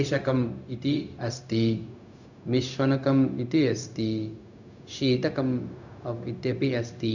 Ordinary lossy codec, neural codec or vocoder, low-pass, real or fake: none; codec, 16 kHz, 0.4 kbps, LongCat-Audio-Codec; 7.2 kHz; fake